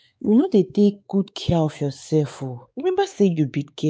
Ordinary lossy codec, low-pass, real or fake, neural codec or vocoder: none; none; fake; codec, 16 kHz, 4 kbps, X-Codec, WavLM features, trained on Multilingual LibriSpeech